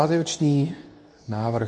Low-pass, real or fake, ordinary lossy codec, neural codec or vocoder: 10.8 kHz; fake; MP3, 48 kbps; codec, 24 kHz, 0.9 kbps, WavTokenizer, medium speech release version 2